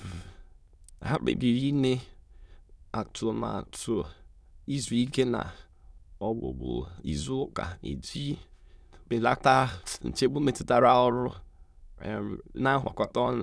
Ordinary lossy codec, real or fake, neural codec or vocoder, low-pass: none; fake; autoencoder, 22.05 kHz, a latent of 192 numbers a frame, VITS, trained on many speakers; none